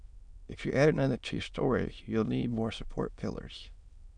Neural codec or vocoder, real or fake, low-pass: autoencoder, 22.05 kHz, a latent of 192 numbers a frame, VITS, trained on many speakers; fake; 9.9 kHz